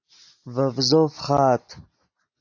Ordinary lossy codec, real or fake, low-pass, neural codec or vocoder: AAC, 48 kbps; real; 7.2 kHz; none